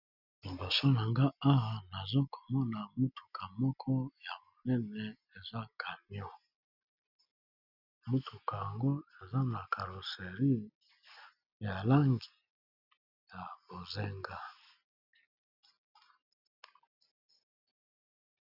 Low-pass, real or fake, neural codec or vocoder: 5.4 kHz; real; none